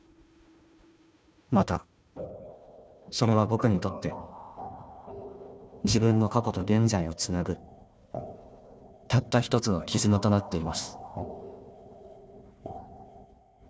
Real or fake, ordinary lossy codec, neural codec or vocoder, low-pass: fake; none; codec, 16 kHz, 1 kbps, FunCodec, trained on Chinese and English, 50 frames a second; none